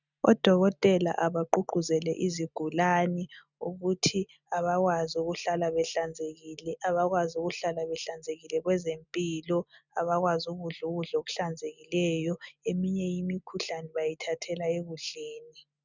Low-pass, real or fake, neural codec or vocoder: 7.2 kHz; real; none